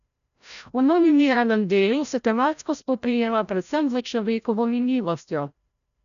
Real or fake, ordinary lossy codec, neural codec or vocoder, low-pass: fake; none; codec, 16 kHz, 0.5 kbps, FreqCodec, larger model; 7.2 kHz